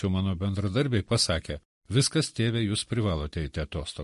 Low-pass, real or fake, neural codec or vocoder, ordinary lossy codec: 14.4 kHz; real; none; MP3, 48 kbps